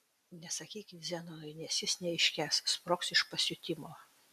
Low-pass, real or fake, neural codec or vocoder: 14.4 kHz; real; none